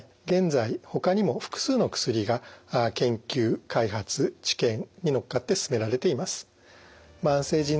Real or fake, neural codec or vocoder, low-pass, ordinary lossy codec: real; none; none; none